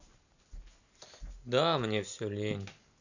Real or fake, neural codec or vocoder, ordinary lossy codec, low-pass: real; none; none; 7.2 kHz